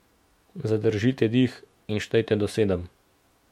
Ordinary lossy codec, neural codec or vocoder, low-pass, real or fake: MP3, 64 kbps; autoencoder, 48 kHz, 128 numbers a frame, DAC-VAE, trained on Japanese speech; 19.8 kHz; fake